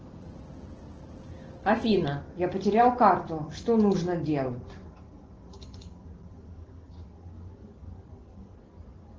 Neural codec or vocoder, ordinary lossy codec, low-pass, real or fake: none; Opus, 16 kbps; 7.2 kHz; real